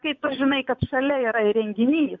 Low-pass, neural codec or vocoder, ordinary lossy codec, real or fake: 7.2 kHz; vocoder, 24 kHz, 100 mel bands, Vocos; MP3, 64 kbps; fake